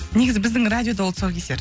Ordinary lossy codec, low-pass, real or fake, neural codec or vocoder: none; none; real; none